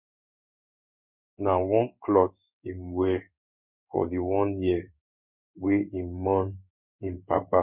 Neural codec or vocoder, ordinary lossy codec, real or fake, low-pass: codec, 16 kHz in and 24 kHz out, 1 kbps, XY-Tokenizer; Opus, 64 kbps; fake; 3.6 kHz